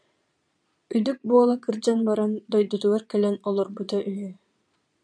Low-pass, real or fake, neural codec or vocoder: 9.9 kHz; fake; vocoder, 22.05 kHz, 80 mel bands, Vocos